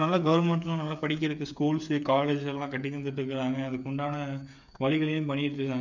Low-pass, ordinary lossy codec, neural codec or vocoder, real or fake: 7.2 kHz; none; codec, 16 kHz, 8 kbps, FreqCodec, smaller model; fake